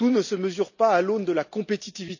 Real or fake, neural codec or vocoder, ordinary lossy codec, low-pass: real; none; none; 7.2 kHz